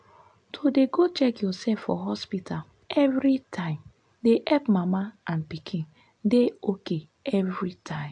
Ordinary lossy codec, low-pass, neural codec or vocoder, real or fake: AAC, 64 kbps; 9.9 kHz; none; real